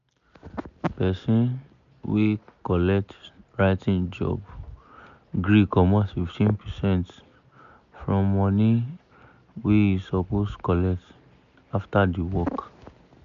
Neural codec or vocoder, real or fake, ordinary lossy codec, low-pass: none; real; MP3, 96 kbps; 7.2 kHz